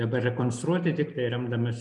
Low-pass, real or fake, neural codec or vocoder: 10.8 kHz; real; none